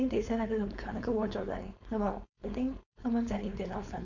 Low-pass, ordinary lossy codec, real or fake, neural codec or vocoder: 7.2 kHz; none; fake; codec, 16 kHz, 4.8 kbps, FACodec